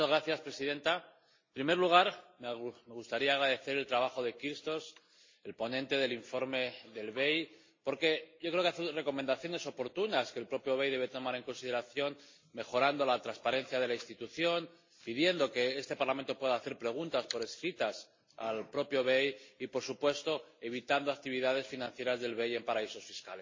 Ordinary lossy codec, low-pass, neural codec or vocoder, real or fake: MP3, 32 kbps; 7.2 kHz; none; real